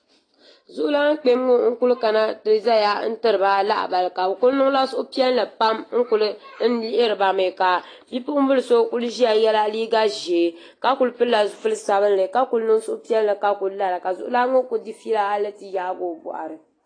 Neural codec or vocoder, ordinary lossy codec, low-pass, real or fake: none; AAC, 32 kbps; 9.9 kHz; real